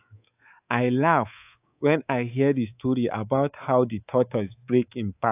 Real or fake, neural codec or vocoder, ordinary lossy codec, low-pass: fake; codec, 24 kHz, 3.1 kbps, DualCodec; AAC, 32 kbps; 3.6 kHz